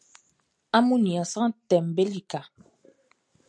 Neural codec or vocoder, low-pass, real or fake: none; 9.9 kHz; real